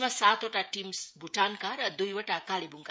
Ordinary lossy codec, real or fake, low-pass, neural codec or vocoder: none; fake; none; codec, 16 kHz, 16 kbps, FreqCodec, smaller model